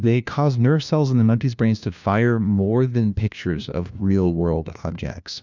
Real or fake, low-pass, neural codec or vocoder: fake; 7.2 kHz; codec, 16 kHz, 1 kbps, FunCodec, trained on LibriTTS, 50 frames a second